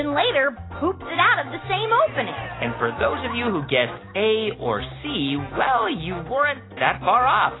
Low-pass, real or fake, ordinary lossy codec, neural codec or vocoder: 7.2 kHz; real; AAC, 16 kbps; none